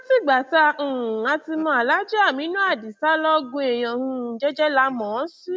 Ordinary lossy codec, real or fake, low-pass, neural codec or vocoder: none; real; none; none